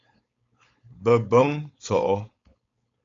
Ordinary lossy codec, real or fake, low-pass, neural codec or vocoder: AAC, 32 kbps; fake; 7.2 kHz; codec, 16 kHz, 4.8 kbps, FACodec